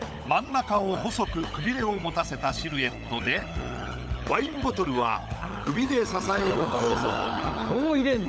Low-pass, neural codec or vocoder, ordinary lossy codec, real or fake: none; codec, 16 kHz, 16 kbps, FunCodec, trained on LibriTTS, 50 frames a second; none; fake